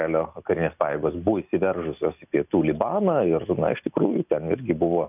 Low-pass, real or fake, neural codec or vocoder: 3.6 kHz; real; none